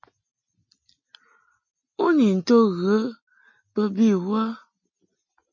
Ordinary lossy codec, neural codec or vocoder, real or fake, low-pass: MP3, 32 kbps; none; real; 7.2 kHz